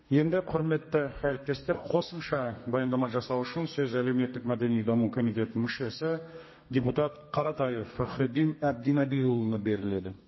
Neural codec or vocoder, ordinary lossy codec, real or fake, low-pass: codec, 32 kHz, 1.9 kbps, SNAC; MP3, 24 kbps; fake; 7.2 kHz